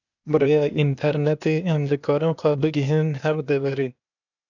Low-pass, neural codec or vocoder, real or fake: 7.2 kHz; codec, 16 kHz, 0.8 kbps, ZipCodec; fake